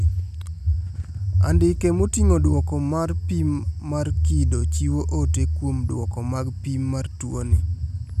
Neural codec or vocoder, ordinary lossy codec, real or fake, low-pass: none; none; real; 14.4 kHz